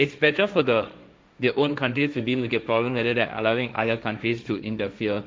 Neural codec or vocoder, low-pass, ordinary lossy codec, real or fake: codec, 16 kHz, 1.1 kbps, Voila-Tokenizer; none; none; fake